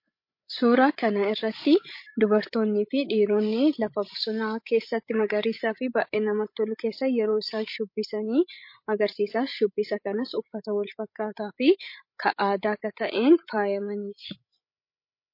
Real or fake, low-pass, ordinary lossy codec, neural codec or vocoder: fake; 5.4 kHz; MP3, 32 kbps; codec, 16 kHz, 16 kbps, FreqCodec, larger model